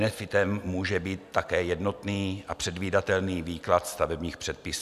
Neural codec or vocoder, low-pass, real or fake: none; 14.4 kHz; real